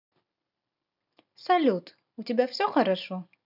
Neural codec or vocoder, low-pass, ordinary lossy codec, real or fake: none; 5.4 kHz; none; real